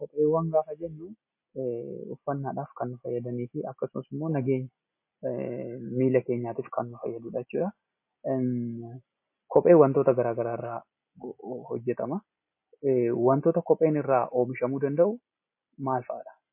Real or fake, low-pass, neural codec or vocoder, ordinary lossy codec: real; 3.6 kHz; none; MP3, 24 kbps